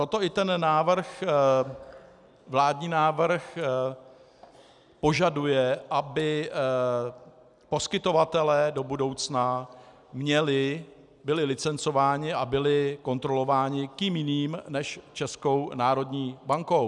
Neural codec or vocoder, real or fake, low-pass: none; real; 10.8 kHz